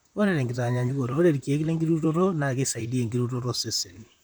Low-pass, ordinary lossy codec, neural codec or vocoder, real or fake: none; none; vocoder, 44.1 kHz, 128 mel bands, Pupu-Vocoder; fake